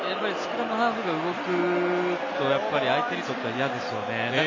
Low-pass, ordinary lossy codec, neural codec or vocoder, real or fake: 7.2 kHz; MP3, 32 kbps; none; real